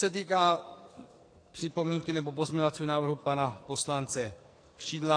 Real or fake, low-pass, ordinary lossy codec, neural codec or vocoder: fake; 9.9 kHz; AAC, 48 kbps; codec, 44.1 kHz, 2.6 kbps, SNAC